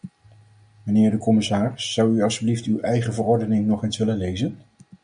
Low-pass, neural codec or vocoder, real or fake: 9.9 kHz; none; real